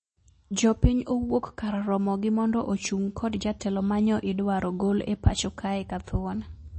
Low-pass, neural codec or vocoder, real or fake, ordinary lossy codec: 9.9 kHz; none; real; MP3, 32 kbps